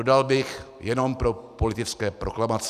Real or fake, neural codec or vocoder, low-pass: real; none; 14.4 kHz